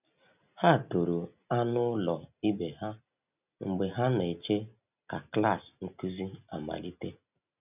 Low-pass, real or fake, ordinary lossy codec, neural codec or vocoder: 3.6 kHz; real; none; none